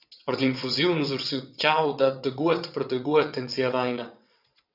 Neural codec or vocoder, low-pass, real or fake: vocoder, 22.05 kHz, 80 mel bands, WaveNeXt; 5.4 kHz; fake